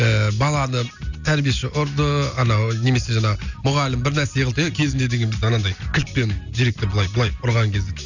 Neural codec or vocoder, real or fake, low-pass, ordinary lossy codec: none; real; 7.2 kHz; none